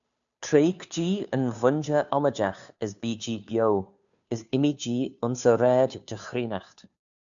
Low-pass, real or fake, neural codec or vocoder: 7.2 kHz; fake; codec, 16 kHz, 2 kbps, FunCodec, trained on Chinese and English, 25 frames a second